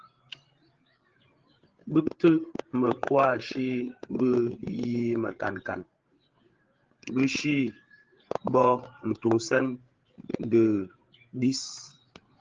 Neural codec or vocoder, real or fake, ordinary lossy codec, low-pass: codec, 16 kHz, 8 kbps, FreqCodec, larger model; fake; Opus, 16 kbps; 7.2 kHz